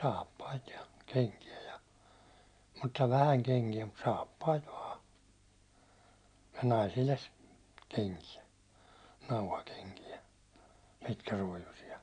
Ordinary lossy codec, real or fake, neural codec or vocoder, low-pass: none; real; none; 9.9 kHz